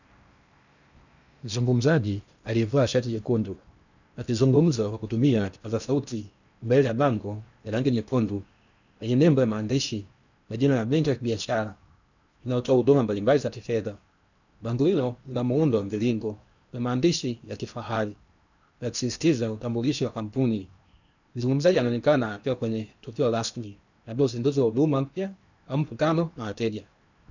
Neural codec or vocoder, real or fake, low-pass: codec, 16 kHz in and 24 kHz out, 0.8 kbps, FocalCodec, streaming, 65536 codes; fake; 7.2 kHz